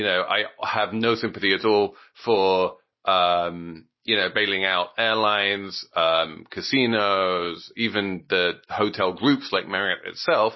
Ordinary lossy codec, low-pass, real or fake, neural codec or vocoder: MP3, 24 kbps; 7.2 kHz; real; none